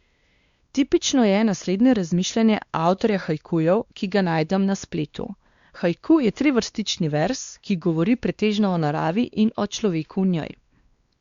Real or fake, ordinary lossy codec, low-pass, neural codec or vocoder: fake; Opus, 64 kbps; 7.2 kHz; codec, 16 kHz, 2 kbps, X-Codec, WavLM features, trained on Multilingual LibriSpeech